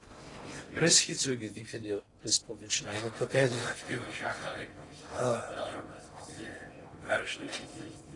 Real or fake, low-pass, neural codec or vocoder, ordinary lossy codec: fake; 10.8 kHz; codec, 16 kHz in and 24 kHz out, 0.6 kbps, FocalCodec, streaming, 4096 codes; AAC, 32 kbps